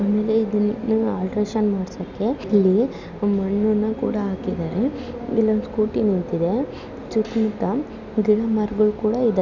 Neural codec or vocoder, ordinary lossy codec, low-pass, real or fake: none; none; 7.2 kHz; real